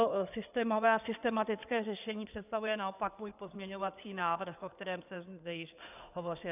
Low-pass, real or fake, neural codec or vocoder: 3.6 kHz; fake; codec, 16 kHz in and 24 kHz out, 2.2 kbps, FireRedTTS-2 codec